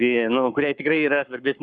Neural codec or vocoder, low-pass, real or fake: codec, 44.1 kHz, 7.8 kbps, Pupu-Codec; 9.9 kHz; fake